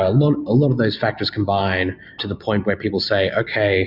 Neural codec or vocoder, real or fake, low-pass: none; real; 5.4 kHz